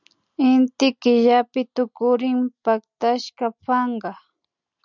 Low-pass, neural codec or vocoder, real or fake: 7.2 kHz; none; real